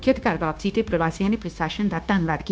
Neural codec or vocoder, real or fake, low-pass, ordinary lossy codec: codec, 16 kHz, 0.9 kbps, LongCat-Audio-Codec; fake; none; none